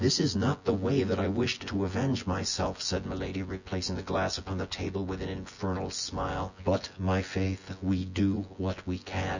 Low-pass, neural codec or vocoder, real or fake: 7.2 kHz; vocoder, 24 kHz, 100 mel bands, Vocos; fake